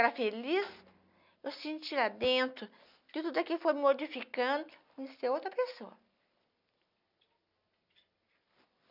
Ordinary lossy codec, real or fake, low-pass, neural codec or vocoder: none; real; 5.4 kHz; none